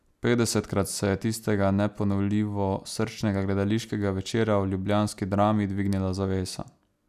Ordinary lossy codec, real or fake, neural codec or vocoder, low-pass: none; real; none; 14.4 kHz